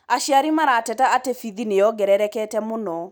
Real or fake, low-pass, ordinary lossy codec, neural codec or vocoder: real; none; none; none